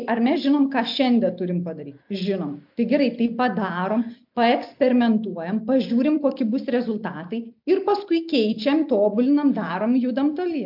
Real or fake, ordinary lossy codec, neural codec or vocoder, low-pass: real; MP3, 48 kbps; none; 5.4 kHz